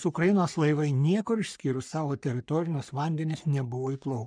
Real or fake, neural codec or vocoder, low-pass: fake; codec, 44.1 kHz, 3.4 kbps, Pupu-Codec; 9.9 kHz